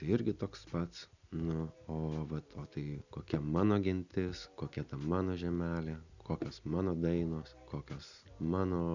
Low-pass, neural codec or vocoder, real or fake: 7.2 kHz; none; real